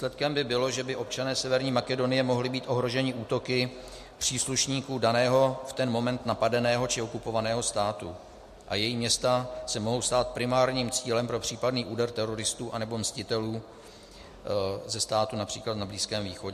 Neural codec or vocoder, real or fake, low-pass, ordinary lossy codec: none; real; 14.4 kHz; MP3, 64 kbps